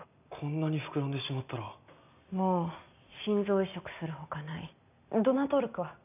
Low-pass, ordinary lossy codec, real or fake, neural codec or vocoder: 3.6 kHz; none; real; none